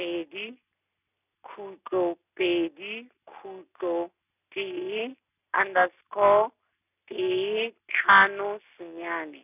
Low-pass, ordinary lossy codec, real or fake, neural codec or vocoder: 3.6 kHz; none; real; none